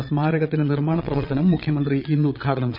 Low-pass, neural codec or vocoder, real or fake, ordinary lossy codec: 5.4 kHz; vocoder, 22.05 kHz, 80 mel bands, Vocos; fake; none